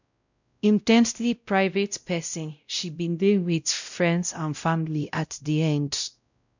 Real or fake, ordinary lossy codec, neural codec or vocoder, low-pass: fake; none; codec, 16 kHz, 0.5 kbps, X-Codec, WavLM features, trained on Multilingual LibriSpeech; 7.2 kHz